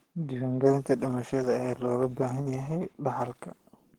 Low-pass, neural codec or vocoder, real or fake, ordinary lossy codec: 19.8 kHz; codec, 44.1 kHz, 7.8 kbps, Pupu-Codec; fake; Opus, 16 kbps